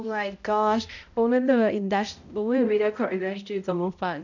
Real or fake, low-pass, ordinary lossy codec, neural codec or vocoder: fake; 7.2 kHz; none; codec, 16 kHz, 0.5 kbps, X-Codec, HuBERT features, trained on balanced general audio